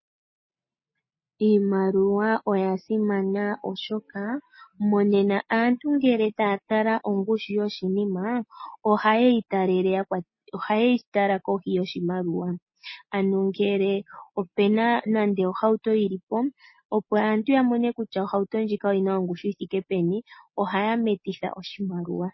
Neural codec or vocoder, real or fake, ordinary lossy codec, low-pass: none; real; MP3, 24 kbps; 7.2 kHz